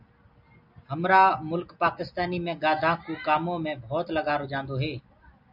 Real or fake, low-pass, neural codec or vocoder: real; 5.4 kHz; none